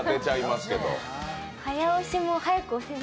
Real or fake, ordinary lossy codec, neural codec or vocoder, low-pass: real; none; none; none